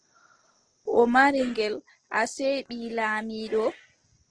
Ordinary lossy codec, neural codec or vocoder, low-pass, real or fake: Opus, 16 kbps; none; 9.9 kHz; real